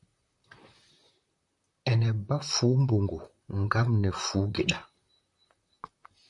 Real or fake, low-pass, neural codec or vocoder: fake; 10.8 kHz; vocoder, 44.1 kHz, 128 mel bands, Pupu-Vocoder